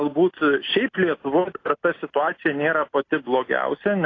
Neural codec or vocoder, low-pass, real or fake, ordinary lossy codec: none; 7.2 kHz; real; AAC, 32 kbps